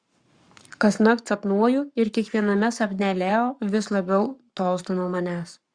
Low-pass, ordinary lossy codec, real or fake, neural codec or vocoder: 9.9 kHz; Opus, 64 kbps; fake; codec, 44.1 kHz, 7.8 kbps, Pupu-Codec